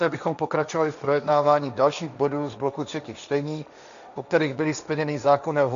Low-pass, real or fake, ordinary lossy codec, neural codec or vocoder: 7.2 kHz; fake; AAC, 96 kbps; codec, 16 kHz, 1.1 kbps, Voila-Tokenizer